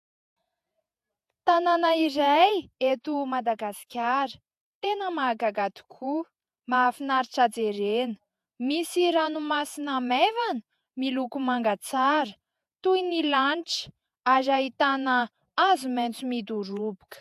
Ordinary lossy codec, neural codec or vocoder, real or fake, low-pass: AAC, 96 kbps; vocoder, 48 kHz, 128 mel bands, Vocos; fake; 14.4 kHz